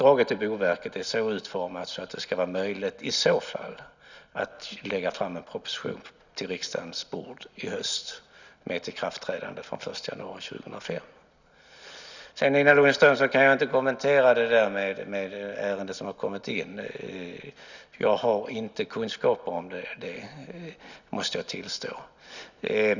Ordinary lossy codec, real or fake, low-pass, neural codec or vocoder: none; real; 7.2 kHz; none